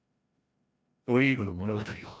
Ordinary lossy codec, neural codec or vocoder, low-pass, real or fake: none; codec, 16 kHz, 1 kbps, FreqCodec, larger model; none; fake